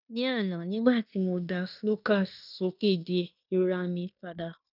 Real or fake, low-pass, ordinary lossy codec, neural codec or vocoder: fake; 5.4 kHz; none; codec, 16 kHz in and 24 kHz out, 0.9 kbps, LongCat-Audio-Codec, fine tuned four codebook decoder